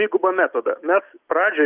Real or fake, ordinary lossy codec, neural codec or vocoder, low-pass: real; Opus, 24 kbps; none; 3.6 kHz